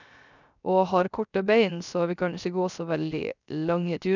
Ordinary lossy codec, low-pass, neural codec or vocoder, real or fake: none; 7.2 kHz; codec, 16 kHz, 0.3 kbps, FocalCodec; fake